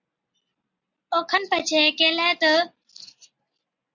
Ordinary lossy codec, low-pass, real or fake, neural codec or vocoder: Opus, 64 kbps; 7.2 kHz; real; none